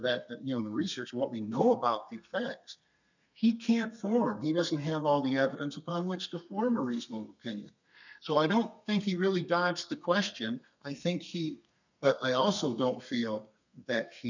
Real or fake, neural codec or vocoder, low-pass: fake; codec, 32 kHz, 1.9 kbps, SNAC; 7.2 kHz